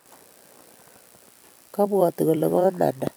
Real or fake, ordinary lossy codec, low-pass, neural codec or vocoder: fake; none; none; vocoder, 44.1 kHz, 128 mel bands every 256 samples, BigVGAN v2